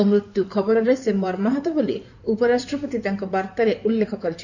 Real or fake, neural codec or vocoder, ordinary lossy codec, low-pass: fake; codec, 16 kHz in and 24 kHz out, 2.2 kbps, FireRedTTS-2 codec; none; 7.2 kHz